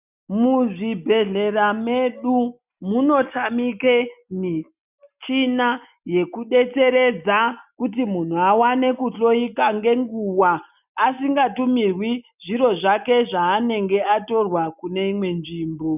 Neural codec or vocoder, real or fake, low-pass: none; real; 3.6 kHz